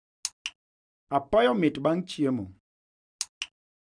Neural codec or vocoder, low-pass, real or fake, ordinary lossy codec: none; 9.9 kHz; real; none